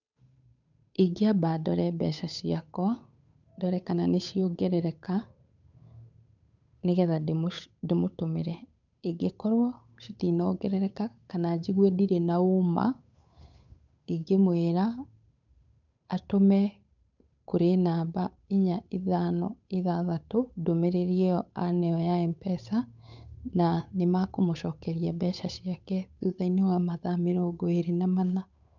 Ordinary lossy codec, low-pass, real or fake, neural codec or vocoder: none; 7.2 kHz; fake; codec, 16 kHz, 8 kbps, FunCodec, trained on Chinese and English, 25 frames a second